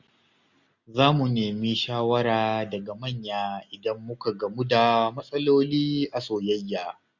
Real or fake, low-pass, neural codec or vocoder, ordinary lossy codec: real; 7.2 kHz; none; none